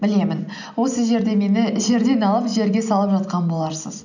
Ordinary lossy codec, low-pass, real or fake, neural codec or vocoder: none; 7.2 kHz; real; none